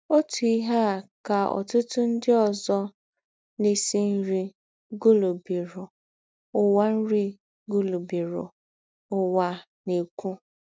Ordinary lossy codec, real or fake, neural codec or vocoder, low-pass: none; real; none; none